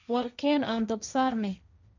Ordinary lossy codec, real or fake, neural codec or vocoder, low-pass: none; fake; codec, 16 kHz, 1.1 kbps, Voila-Tokenizer; 7.2 kHz